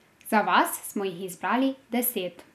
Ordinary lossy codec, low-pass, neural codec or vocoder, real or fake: none; 14.4 kHz; none; real